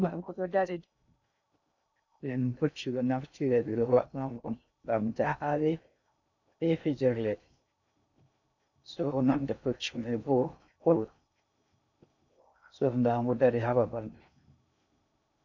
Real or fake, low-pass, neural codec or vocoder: fake; 7.2 kHz; codec, 16 kHz in and 24 kHz out, 0.6 kbps, FocalCodec, streaming, 2048 codes